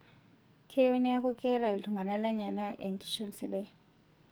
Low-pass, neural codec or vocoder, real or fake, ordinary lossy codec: none; codec, 44.1 kHz, 2.6 kbps, SNAC; fake; none